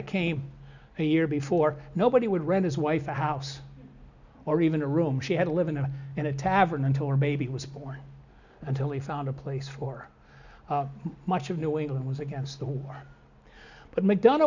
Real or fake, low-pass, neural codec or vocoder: real; 7.2 kHz; none